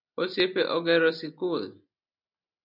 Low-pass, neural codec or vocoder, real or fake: 5.4 kHz; none; real